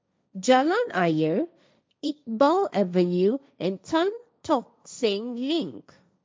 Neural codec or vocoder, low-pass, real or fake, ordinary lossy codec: codec, 16 kHz, 1.1 kbps, Voila-Tokenizer; none; fake; none